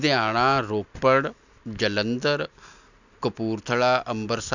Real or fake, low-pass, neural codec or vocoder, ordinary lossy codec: real; 7.2 kHz; none; none